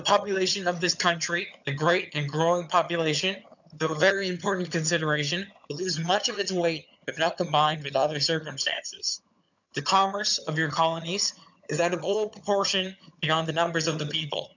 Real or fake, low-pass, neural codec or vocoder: fake; 7.2 kHz; vocoder, 22.05 kHz, 80 mel bands, HiFi-GAN